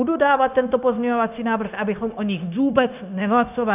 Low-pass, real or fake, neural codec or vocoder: 3.6 kHz; fake; codec, 16 kHz, 0.9 kbps, LongCat-Audio-Codec